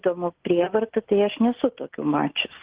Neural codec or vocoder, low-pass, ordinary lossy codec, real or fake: none; 3.6 kHz; Opus, 16 kbps; real